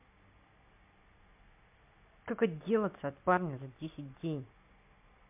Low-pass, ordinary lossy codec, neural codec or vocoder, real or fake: 3.6 kHz; MP3, 32 kbps; none; real